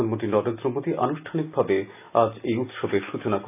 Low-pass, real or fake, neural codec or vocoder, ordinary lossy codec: 3.6 kHz; real; none; none